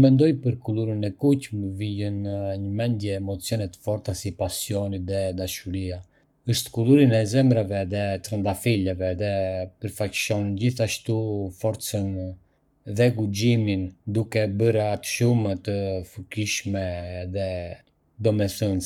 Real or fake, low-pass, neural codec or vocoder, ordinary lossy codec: fake; 19.8 kHz; codec, 44.1 kHz, 7.8 kbps, Pupu-Codec; none